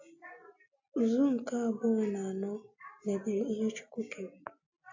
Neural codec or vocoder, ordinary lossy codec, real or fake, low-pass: none; AAC, 48 kbps; real; 7.2 kHz